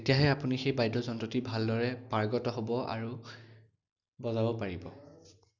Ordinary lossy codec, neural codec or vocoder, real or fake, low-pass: none; none; real; 7.2 kHz